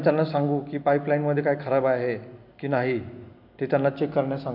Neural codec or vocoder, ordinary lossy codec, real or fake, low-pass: none; none; real; 5.4 kHz